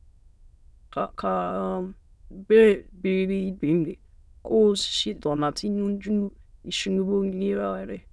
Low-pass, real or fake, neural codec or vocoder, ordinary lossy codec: none; fake; autoencoder, 22.05 kHz, a latent of 192 numbers a frame, VITS, trained on many speakers; none